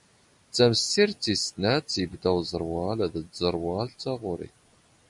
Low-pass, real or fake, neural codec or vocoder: 10.8 kHz; real; none